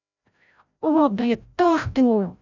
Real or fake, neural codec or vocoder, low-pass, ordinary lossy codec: fake; codec, 16 kHz, 0.5 kbps, FreqCodec, larger model; 7.2 kHz; none